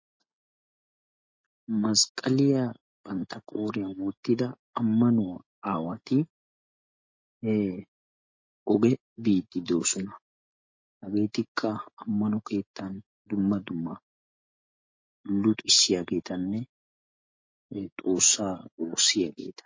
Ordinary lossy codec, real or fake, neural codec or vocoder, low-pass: MP3, 32 kbps; real; none; 7.2 kHz